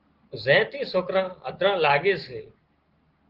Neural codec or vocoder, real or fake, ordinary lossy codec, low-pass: none; real; Opus, 16 kbps; 5.4 kHz